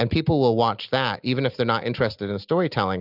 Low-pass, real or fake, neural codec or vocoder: 5.4 kHz; real; none